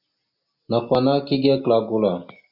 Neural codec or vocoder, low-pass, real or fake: none; 5.4 kHz; real